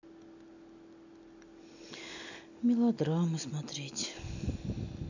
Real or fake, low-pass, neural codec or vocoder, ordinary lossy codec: real; 7.2 kHz; none; none